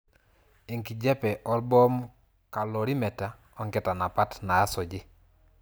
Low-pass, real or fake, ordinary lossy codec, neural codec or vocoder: none; real; none; none